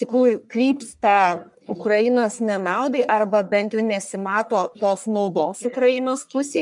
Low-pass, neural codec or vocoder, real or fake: 10.8 kHz; codec, 44.1 kHz, 1.7 kbps, Pupu-Codec; fake